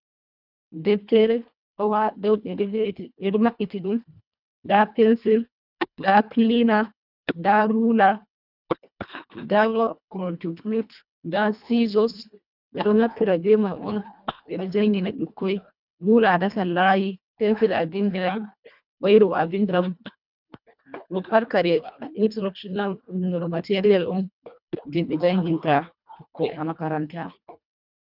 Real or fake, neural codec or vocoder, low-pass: fake; codec, 24 kHz, 1.5 kbps, HILCodec; 5.4 kHz